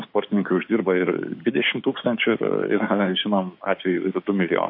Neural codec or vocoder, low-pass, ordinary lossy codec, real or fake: codec, 16 kHz, 6 kbps, DAC; 5.4 kHz; MP3, 32 kbps; fake